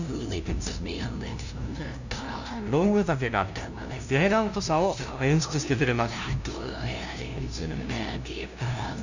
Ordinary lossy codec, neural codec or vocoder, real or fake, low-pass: none; codec, 16 kHz, 0.5 kbps, FunCodec, trained on LibriTTS, 25 frames a second; fake; 7.2 kHz